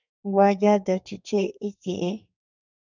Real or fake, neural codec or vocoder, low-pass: fake; codec, 32 kHz, 1.9 kbps, SNAC; 7.2 kHz